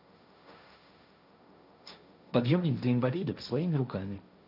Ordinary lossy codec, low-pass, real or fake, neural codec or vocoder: none; 5.4 kHz; fake; codec, 16 kHz, 1.1 kbps, Voila-Tokenizer